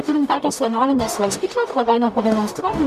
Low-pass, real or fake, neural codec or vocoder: 14.4 kHz; fake; codec, 44.1 kHz, 0.9 kbps, DAC